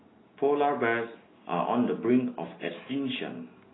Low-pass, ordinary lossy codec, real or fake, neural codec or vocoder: 7.2 kHz; AAC, 16 kbps; real; none